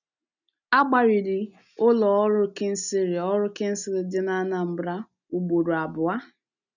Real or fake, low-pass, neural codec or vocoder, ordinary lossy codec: real; 7.2 kHz; none; none